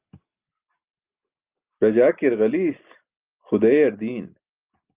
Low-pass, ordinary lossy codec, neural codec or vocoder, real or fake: 3.6 kHz; Opus, 32 kbps; none; real